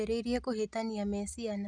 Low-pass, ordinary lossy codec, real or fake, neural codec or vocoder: 9.9 kHz; none; real; none